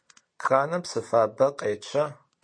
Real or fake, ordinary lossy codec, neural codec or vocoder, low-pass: real; MP3, 48 kbps; none; 9.9 kHz